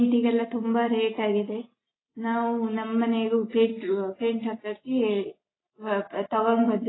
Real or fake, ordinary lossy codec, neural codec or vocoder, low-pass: real; AAC, 16 kbps; none; 7.2 kHz